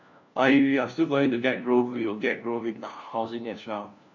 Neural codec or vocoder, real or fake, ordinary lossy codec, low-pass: codec, 16 kHz, 1 kbps, FunCodec, trained on LibriTTS, 50 frames a second; fake; none; 7.2 kHz